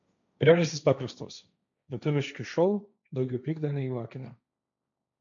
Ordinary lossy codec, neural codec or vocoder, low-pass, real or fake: MP3, 96 kbps; codec, 16 kHz, 1.1 kbps, Voila-Tokenizer; 7.2 kHz; fake